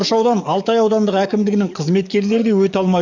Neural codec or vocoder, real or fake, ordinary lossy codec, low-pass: codec, 44.1 kHz, 7.8 kbps, DAC; fake; none; 7.2 kHz